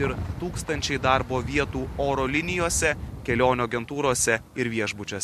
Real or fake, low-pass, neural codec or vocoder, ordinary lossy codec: real; 14.4 kHz; none; MP3, 64 kbps